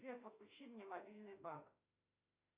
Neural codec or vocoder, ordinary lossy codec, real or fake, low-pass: autoencoder, 48 kHz, 32 numbers a frame, DAC-VAE, trained on Japanese speech; Opus, 64 kbps; fake; 3.6 kHz